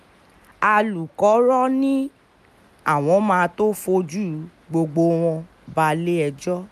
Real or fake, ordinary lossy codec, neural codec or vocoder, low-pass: real; none; none; 14.4 kHz